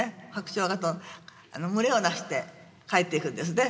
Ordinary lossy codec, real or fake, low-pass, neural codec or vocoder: none; real; none; none